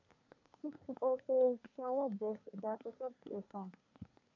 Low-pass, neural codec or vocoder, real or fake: 7.2 kHz; codec, 24 kHz, 1 kbps, SNAC; fake